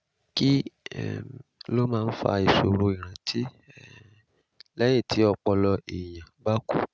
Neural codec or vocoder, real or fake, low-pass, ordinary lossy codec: none; real; none; none